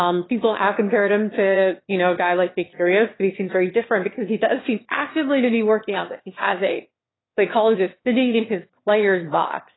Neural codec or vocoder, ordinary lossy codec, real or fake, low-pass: autoencoder, 22.05 kHz, a latent of 192 numbers a frame, VITS, trained on one speaker; AAC, 16 kbps; fake; 7.2 kHz